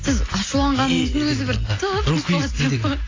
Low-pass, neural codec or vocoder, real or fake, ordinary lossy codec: 7.2 kHz; none; real; AAC, 32 kbps